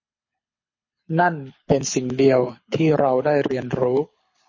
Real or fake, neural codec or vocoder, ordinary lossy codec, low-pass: fake; codec, 24 kHz, 6 kbps, HILCodec; MP3, 32 kbps; 7.2 kHz